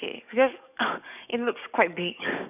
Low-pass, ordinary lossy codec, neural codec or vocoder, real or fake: 3.6 kHz; none; codec, 44.1 kHz, 7.8 kbps, DAC; fake